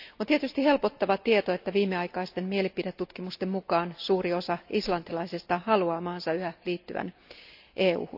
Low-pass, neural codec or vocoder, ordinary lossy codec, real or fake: 5.4 kHz; none; AAC, 48 kbps; real